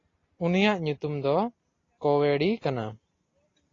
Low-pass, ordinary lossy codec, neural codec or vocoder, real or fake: 7.2 kHz; AAC, 32 kbps; none; real